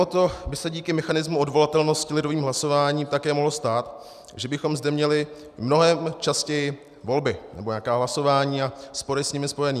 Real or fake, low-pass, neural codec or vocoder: real; 14.4 kHz; none